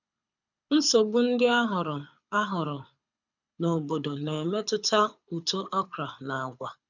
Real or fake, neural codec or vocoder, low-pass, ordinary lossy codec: fake; codec, 24 kHz, 6 kbps, HILCodec; 7.2 kHz; none